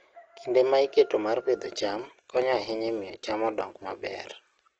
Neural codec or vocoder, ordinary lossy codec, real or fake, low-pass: none; Opus, 16 kbps; real; 7.2 kHz